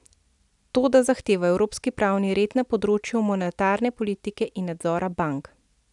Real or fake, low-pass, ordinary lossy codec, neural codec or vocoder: real; 10.8 kHz; none; none